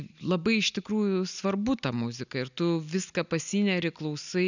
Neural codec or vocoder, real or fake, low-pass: none; real; 7.2 kHz